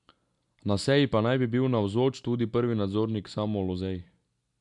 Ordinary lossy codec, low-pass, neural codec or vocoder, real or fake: none; 10.8 kHz; none; real